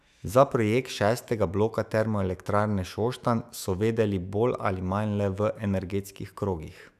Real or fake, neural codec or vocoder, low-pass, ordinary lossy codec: fake; autoencoder, 48 kHz, 128 numbers a frame, DAC-VAE, trained on Japanese speech; 14.4 kHz; none